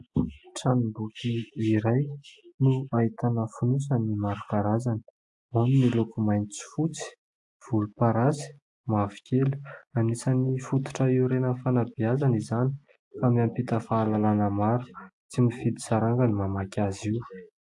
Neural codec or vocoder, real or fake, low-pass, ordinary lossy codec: none; real; 10.8 kHz; AAC, 64 kbps